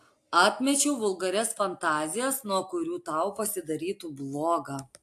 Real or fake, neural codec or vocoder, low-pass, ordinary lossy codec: real; none; 14.4 kHz; AAC, 64 kbps